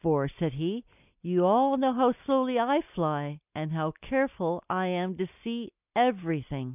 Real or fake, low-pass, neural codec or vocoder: real; 3.6 kHz; none